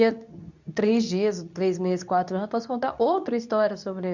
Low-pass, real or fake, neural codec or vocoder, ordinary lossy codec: 7.2 kHz; fake; codec, 24 kHz, 0.9 kbps, WavTokenizer, medium speech release version 2; none